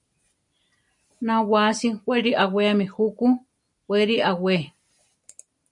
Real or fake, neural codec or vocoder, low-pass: real; none; 10.8 kHz